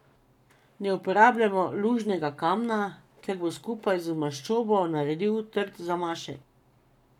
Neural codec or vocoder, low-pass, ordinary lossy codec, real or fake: codec, 44.1 kHz, 7.8 kbps, Pupu-Codec; 19.8 kHz; none; fake